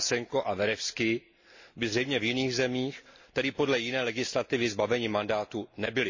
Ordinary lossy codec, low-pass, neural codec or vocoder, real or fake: MP3, 32 kbps; 7.2 kHz; none; real